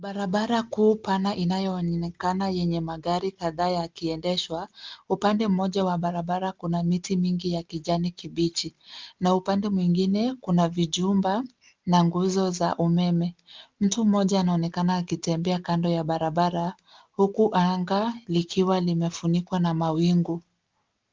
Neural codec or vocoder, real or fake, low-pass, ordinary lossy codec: none; real; 7.2 kHz; Opus, 32 kbps